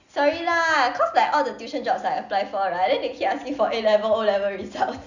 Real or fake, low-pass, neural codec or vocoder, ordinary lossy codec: real; 7.2 kHz; none; none